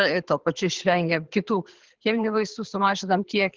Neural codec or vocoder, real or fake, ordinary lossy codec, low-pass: codec, 24 kHz, 6 kbps, HILCodec; fake; Opus, 16 kbps; 7.2 kHz